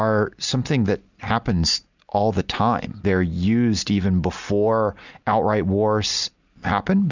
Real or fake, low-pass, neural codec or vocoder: real; 7.2 kHz; none